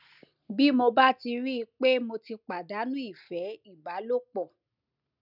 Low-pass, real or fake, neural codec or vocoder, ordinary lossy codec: 5.4 kHz; real; none; none